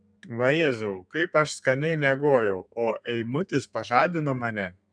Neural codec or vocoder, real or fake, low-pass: codec, 32 kHz, 1.9 kbps, SNAC; fake; 9.9 kHz